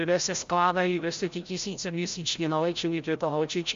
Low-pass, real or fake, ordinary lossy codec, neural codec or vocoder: 7.2 kHz; fake; MP3, 48 kbps; codec, 16 kHz, 0.5 kbps, FreqCodec, larger model